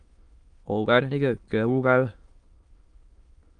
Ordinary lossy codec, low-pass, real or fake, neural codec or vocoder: Opus, 32 kbps; 9.9 kHz; fake; autoencoder, 22.05 kHz, a latent of 192 numbers a frame, VITS, trained on many speakers